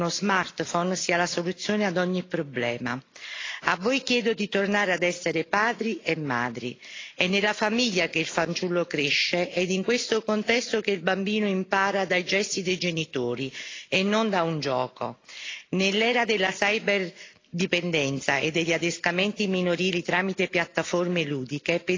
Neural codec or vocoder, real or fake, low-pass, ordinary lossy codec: none; real; 7.2 kHz; AAC, 32 kbps